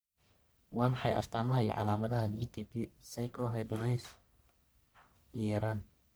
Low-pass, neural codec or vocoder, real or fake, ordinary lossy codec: none; codec, 44.1 kHz, 1.7 kbps, Pupu-Codec; fake; none